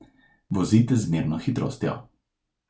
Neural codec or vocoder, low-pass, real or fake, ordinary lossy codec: none; none; real; none